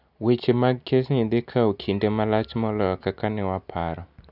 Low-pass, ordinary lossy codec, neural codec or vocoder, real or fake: 5.4 kHz; none; none; real